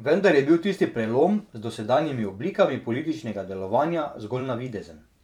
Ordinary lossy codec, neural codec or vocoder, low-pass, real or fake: none; vocoder, 44.1 kHz, 128 mel bands every 512 samples, BigVGAN v2; 19.8 kHz; fake